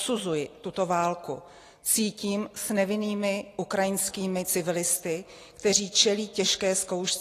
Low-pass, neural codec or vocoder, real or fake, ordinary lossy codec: 14.4 kHz; none; real; AAC, 48 kbps